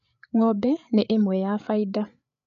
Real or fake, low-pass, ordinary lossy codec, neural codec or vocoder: fake; 7.2 kHz; none; codec, 16 kHz, 8 kbps, FreqCodec, larger model